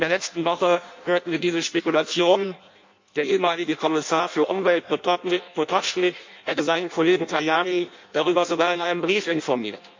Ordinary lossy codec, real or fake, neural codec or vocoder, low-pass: MP3, 48 kbps; fake; codec, 16 kHz in and 24 kHz out, 0.6 kbps, FireRedTTS-2 codec; 7.2 kHz